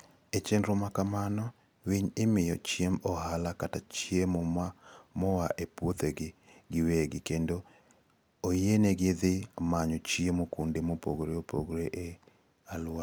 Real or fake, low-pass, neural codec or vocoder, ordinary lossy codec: real; none; none; none